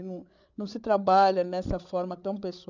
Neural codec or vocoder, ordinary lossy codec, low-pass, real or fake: codec, 16 kHz, 8 kbps, FreqCodec, larger model; none; 7.2 kHz; fake